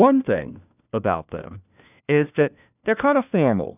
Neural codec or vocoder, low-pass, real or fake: codec, 16 kHz, 1 kbps, FunCodec, trained on LibriTTS, 50 frames a second; 3.6 kHz; fake